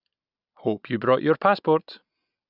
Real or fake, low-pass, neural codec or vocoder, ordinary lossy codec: real; 5.4 kHz; none; none